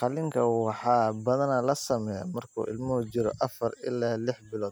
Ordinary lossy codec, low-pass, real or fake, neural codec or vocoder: none; none; real; none